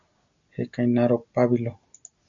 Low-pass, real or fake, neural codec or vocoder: 7.2 kHz; real; none